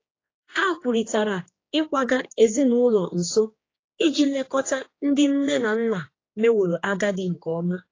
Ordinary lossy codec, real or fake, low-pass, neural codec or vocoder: AAC, 32 kbps; fake; 7.2 kHz; codec, 16 kHz, 2 kbps, X-Codec, HuBERT features, trained on general audio